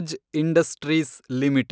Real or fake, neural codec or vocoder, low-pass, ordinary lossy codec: real; none; none; none